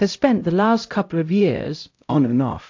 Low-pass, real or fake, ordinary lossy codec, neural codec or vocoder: 7.2 kHz; fake; AAC, 48 kbps; codec, 16 kHz, 0.5 kbps, X-Codec, HuBERT features, trained on LibriSpeech